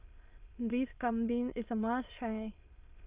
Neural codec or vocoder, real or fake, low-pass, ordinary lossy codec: autoencoder, 22.05 kHz, a latent of 192 numbers a frame, VITS, trained on many speakers; fake; 3.6 kHz; Opus, 24 kbps